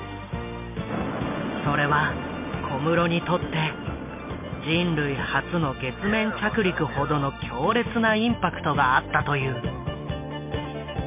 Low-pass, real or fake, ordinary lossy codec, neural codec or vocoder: 3.6 kHz; real; MP3, 32 kbps; none